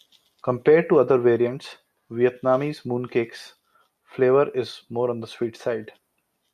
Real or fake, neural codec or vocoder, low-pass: real; none; 14.4 kHz